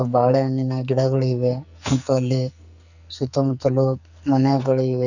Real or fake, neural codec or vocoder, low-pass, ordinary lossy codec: fake; codec, 44.1 kHz, 2.6 kbps, SNAC; 7.2 kHz; none